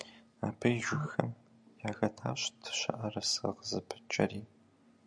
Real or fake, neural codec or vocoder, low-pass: real; none; 9.9 kHz